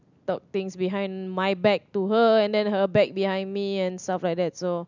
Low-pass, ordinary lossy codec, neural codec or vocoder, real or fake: 7.2 kHz; none; none; real